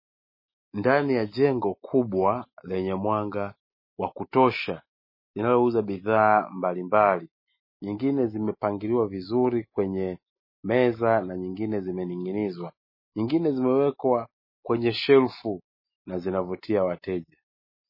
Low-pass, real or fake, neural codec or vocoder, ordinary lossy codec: 5.4 kHz; real; none; MP3, 24 kbps